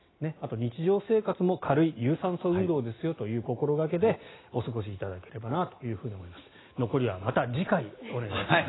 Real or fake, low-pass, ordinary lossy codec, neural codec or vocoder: real; 7.2 kHz; AAC, 16 kbps; none